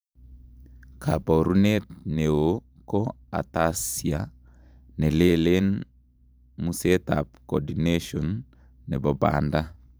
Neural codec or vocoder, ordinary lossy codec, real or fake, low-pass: vocoder, 44.1 kHz, 128 mel bands every 512 samples, BigVGAN v2; none; fake; none